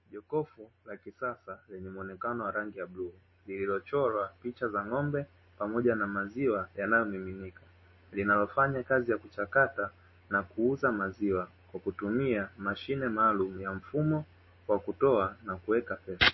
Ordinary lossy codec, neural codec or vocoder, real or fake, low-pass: MP3, 24 kbps; none; real; 7.2 kHz